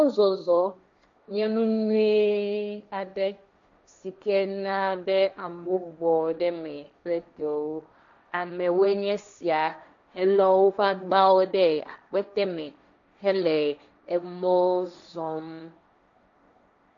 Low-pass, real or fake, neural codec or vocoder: 7.2 kHz; fake; codec, 16 kHz, 1.1 kbps, Voila-Tokenizer